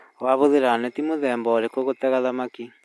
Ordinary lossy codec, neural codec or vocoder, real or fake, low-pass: none; none; real; none